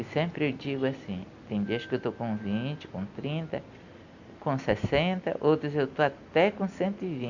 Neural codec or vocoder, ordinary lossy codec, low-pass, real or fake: none; none; 7.2 kHz; real